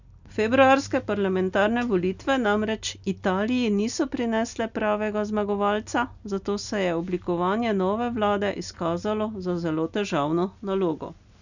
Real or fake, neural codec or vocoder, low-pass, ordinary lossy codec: real; none; 7.2 kHz; none